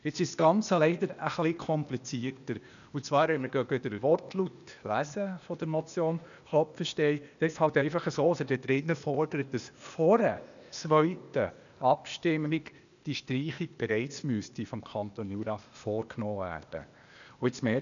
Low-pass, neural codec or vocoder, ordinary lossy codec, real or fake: 7.2 kHz; codec, 16 kHz, 0.8 kbps, ZipCodec; none; fake